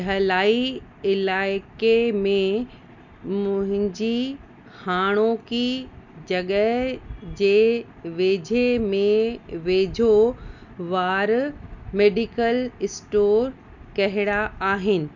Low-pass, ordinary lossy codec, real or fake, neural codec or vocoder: 7.2 kHz; none; real; none